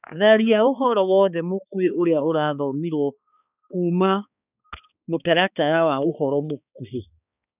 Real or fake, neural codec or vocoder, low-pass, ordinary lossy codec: fake; codec, 16 kHz, 2 kbps, X-Codec, HuBERT features, trained on balanced general audio; 3.6 kHz; none